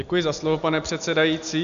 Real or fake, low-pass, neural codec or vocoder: real; 7.2 kHz; none